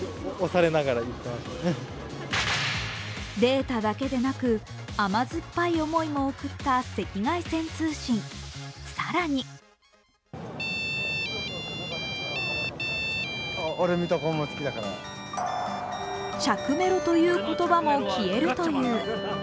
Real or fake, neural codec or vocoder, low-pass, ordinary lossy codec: real; none; none; none